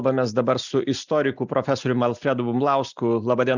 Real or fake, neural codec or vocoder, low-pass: real; none; 7.2 kHz